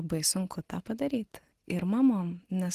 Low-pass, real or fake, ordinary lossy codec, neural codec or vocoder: 14.4 kHz; real; Opus, 16 kbps; none